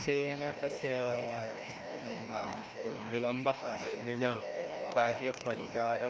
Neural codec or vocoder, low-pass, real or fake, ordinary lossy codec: codec, 16 kHz, 1 kbps, FreqCodec, larger model; none; fake; none